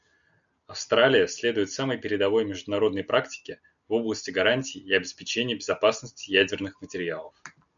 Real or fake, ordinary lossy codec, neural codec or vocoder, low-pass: real; MP3, 96 kbps; none; 7.2 kHz